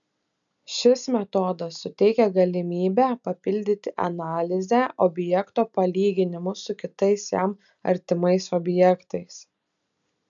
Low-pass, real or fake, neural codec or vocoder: 7.2 kHz; real; none